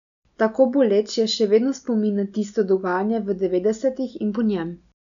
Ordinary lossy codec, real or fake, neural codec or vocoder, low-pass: none; real; none; 7.2 kHz